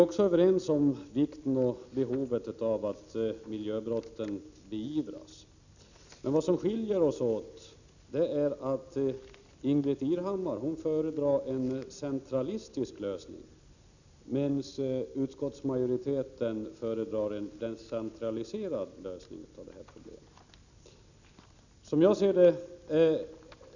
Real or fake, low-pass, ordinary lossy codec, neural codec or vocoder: real; 7.2 kHz; none; none